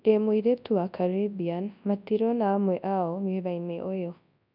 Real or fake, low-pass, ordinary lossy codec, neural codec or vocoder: fake; 5.4 kHz; none; codec, 24 kHz, 0.9 kbps, WavTokenizer, large speech release